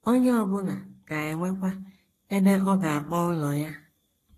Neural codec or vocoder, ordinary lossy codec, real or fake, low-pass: codec, 44.1 kHz, 3.4 kbps, Pupu-Codec; AAC, 48 kbps; fake; 14.4 kHz